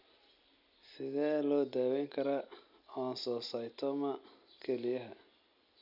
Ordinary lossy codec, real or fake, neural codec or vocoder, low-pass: none; real; none; 5.4 kHz